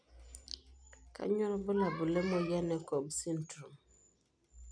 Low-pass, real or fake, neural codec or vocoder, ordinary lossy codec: 9.9 kHz; real; none; none